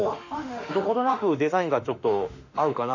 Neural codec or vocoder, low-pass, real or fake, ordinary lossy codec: autoencoder, 48 kHz, 32 numbers a frame, DAC-VAE, trained on Japanese speech; 7.2 kHz; fake; none